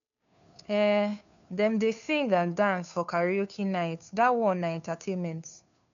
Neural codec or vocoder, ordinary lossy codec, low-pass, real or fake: codec, 16 kHz, 2 kbps, FunCodec, trained on Chinese and English, 25 frames a second; none; 7.2 kHz; fake